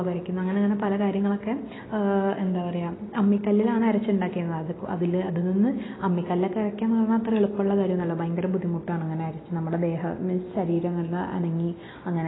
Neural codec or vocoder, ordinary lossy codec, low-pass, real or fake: none; AAC, 16 kbps; 7.2 kHz; real